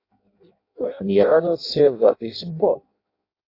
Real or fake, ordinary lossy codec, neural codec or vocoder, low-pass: fake; AAC, 32 kbps; codec, 16 kHz in and 24 kHz out, 0.6 kbps, FireRedTTS-2 codec; 5.4 kHz